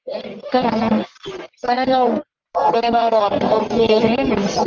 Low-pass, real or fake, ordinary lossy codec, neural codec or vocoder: 7.2 kHz; fake; Opus, 32 kbps; codec, 44.1 kHz, 1.7 kbps, Pupu-Codec